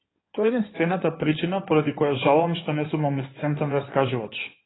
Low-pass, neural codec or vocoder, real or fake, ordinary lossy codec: 7.2 kHz; codec, 16 kHz in and 24 kHz out, 2.2 kbps, FireRedTTS-2 codec; fake; AAC, 16 kbps